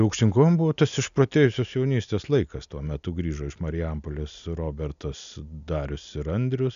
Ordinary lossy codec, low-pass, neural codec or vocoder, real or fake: AAC, 96 kbps; 7.2 kHz; none; real